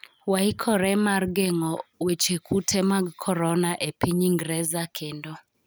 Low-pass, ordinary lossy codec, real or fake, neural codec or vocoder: none; none; real; none